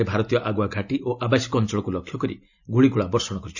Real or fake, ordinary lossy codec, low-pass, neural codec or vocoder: real; none; 7.2 kHz; none